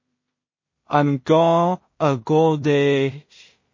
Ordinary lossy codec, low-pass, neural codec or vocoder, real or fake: MP3, 32 kbps; 7.2 kHz; codec, 16 kHz in and 24 kHz out, 0.4 kbps, LongCat-Audio-Codec, two codebook decoder; fake